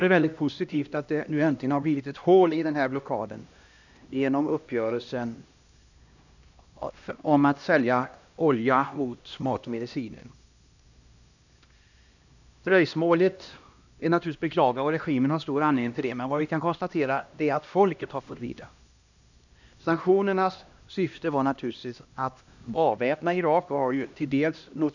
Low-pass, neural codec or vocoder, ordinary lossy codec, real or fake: 7.2 kHz; codec, 16 kHz, 1 kbps, X-Codec, HuBERT features, trained on LibriSpeech; none; fake